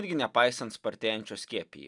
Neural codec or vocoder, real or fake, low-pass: none; real; 10.8 kHz